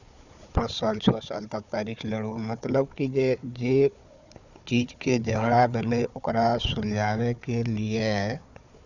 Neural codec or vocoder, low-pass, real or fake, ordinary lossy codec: codec, 16 kHz, 4 kbps, FunCodec, trained on Chinese and English, 50 frames a second; 7.2 kHz; fake; none